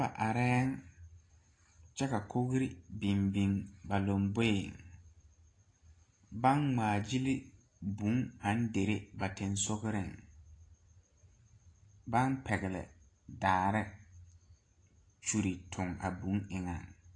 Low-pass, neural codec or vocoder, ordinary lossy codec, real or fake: 9.9 kHz; none; AAC, 32 kbps; real